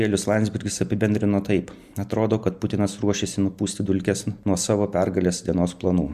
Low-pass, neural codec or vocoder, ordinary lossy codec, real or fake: 14.4 kHz; none; Opus, 64 kbps; real